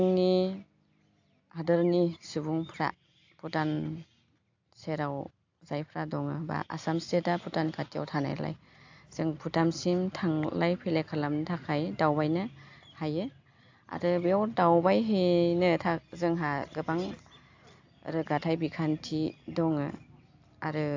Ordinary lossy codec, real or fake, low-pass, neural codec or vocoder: AAC, 48 kbps; real; 7.2 kHz; none